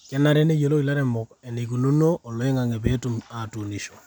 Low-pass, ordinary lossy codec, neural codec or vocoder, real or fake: 19.8 kHz; none; none; real